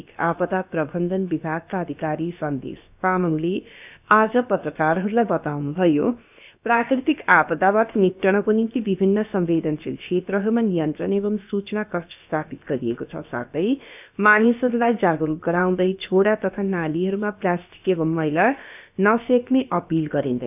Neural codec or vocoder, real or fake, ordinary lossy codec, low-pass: codec, 16 kHz, about 1 kbps, DyCAST, with the encoder's durations; fake; none; 3.6 kHz